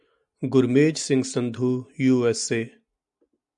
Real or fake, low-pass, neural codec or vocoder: real; 10.8 kHz; none